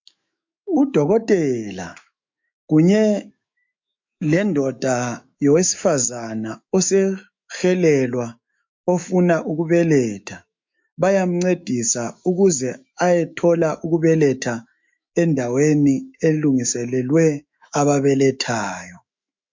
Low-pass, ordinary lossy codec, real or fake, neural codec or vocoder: 7.2 kHz; MP3, 48 kbps; fake; autoencoder, 48 kHz, 128 numbers a frame, DAC-VAE, trained on Japanese speech